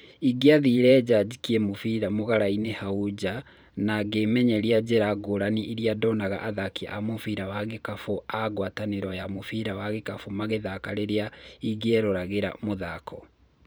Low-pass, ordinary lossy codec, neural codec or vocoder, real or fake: none; none; vocoder, 44.1 kHz, 128 mel bands every 512 samples, BigVGAN v2; fake